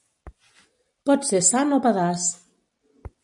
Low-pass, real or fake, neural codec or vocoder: 10.8 kHz; real; none